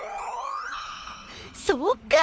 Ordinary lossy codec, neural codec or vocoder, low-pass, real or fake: none; codec, 16 kHz, 4 kbps, FunCodec, trained on LibriTTS, 50 frames a second; none; fake